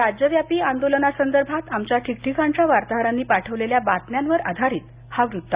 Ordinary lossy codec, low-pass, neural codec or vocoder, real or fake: Opus, 64 kbps; 3.6 kHz; none; real